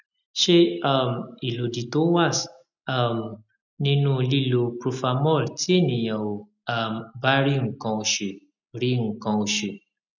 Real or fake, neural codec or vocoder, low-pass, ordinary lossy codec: real; none; 7.2 kHz; none